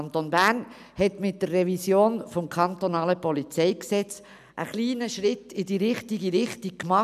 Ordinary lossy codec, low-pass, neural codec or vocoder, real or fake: none; 14.4 kHz; none; real